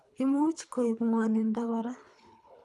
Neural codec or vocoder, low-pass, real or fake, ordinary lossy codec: codec, 24 kHz, 3 kbps, HILCodec; none; fake; none